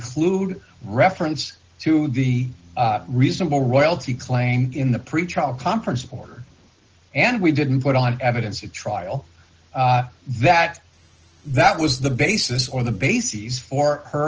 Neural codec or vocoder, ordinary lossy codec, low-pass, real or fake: none; Opus, 16 kbps; 7.2 kHz; real